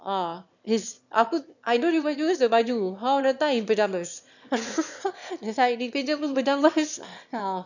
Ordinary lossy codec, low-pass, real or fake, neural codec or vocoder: none; 7.2 kHz; fake; autoencoder, 22.05 kHz, a latent of 192 numbers a frame, VITS, trained on one speaker